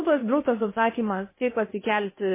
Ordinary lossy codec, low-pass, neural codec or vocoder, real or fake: MP3, 16 kbps; 3.6 kHz; codec, 16 kHz in and 24 kHz out, 0.6 kbps, FocalCodec, streaming, 2048 codes; fake